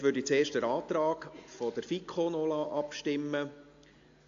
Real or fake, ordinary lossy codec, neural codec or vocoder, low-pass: real; AAC, 48 kbps; none; 7.2 kHz